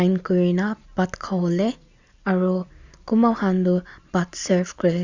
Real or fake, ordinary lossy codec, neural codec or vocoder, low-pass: real; Opus, 64 kbps; none; 7.2 kHz